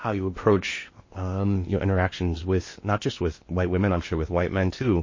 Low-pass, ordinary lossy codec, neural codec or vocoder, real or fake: 7.2 kHz; MP3, 32 kbps; codec, 16 kHz in and 24 kHz out, 0.8 kbps, FocalCodec, streaming, 65536 codes; fake